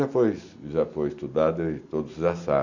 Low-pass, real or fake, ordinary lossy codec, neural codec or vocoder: 7.2 kHz; real; none; none